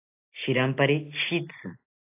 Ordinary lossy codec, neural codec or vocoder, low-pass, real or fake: AAC, 32 kbps; none; 3.6 kHz; real